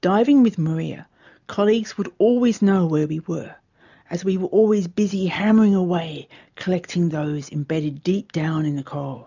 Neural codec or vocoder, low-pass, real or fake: none; 7.2 kHz; real